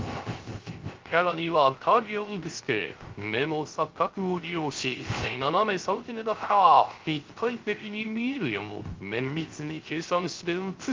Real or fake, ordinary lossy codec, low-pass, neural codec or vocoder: fake; Opus, 32 kbps; 7.2 kHz; codec, 16 kHz, 0.3 kbps, FocalCodec